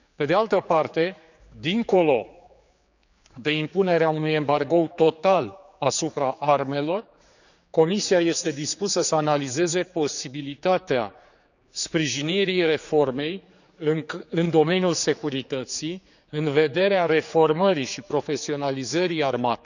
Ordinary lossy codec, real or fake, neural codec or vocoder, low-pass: none; fake; codec, 16 kHz, 4 kbps, X-Codec, HuBERT features, trained on general audio; 7.2 kHz